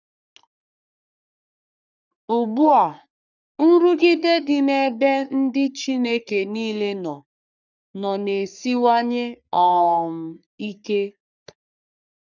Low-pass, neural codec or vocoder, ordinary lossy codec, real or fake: 7.2 kHz; codec, 44.1 kHz, 3.4 kbps, Pupu-Codec; none; fake